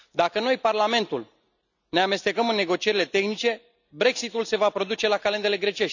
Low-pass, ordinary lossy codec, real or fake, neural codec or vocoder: 7.2 kHz; none; real; none